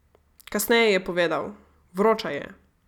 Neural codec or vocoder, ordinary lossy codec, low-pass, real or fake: none; none; 19.8 kHz; real